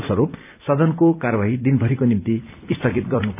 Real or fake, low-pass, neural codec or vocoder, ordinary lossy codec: fake; 3.6 kHz; vocoder, 44.1 kHz, 128 mel bands every 256 samples, BigVGAN v2; none